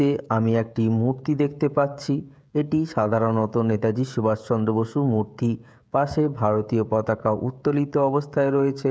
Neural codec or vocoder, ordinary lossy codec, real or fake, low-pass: codec, 16 kHz, 16 kbps, FreqCodec, smaller model; none; fake; none